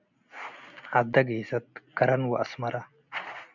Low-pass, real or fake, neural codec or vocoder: 7.2 kHz; real; none